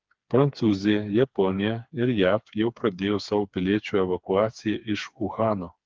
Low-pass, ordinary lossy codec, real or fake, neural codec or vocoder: 7.2 kHz; Opus, 32 kbps; fake; codec, 16 kHz, 4 kbps, FreqCodec, smaller model